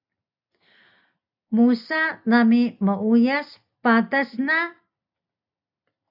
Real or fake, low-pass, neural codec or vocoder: real; 5.4 kHz; none